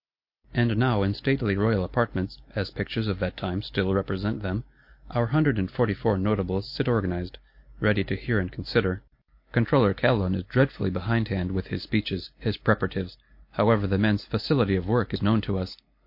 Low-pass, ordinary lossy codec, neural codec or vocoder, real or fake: 5.4 kHz; MP3, 32 kbps; none; real